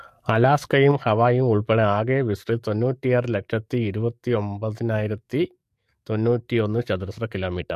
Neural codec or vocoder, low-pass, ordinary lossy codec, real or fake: codec, 44.1 kHz, 7.8 kbps, DAC; 14.4 kHz; MP3, 64 kbps; fake